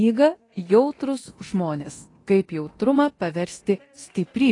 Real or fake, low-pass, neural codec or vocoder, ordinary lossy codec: fake; 10.8 kHz; codec, 24 kHz, 0.9 kbps, DualCodec; AAC, 32 kbps